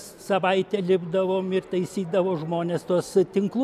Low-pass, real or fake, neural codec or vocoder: 14.4 kHz; real; none